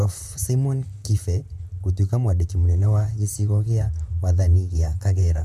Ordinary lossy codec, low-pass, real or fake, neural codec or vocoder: none; 14.4 kHz; fake; vocoder, 44.1 kHz, 128 mel bands, Pupu-Vocoder